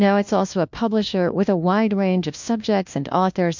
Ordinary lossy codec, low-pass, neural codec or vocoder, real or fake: MP3, 64 kbps; 7.2 kHz; codec, 16 kHz, 1 kbps, FunCodec, trained on LibriTTS, 50 frames a second; fake